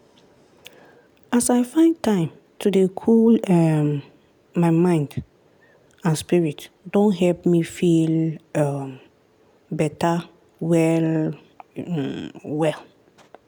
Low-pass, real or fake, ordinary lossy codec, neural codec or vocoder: none; real; none; none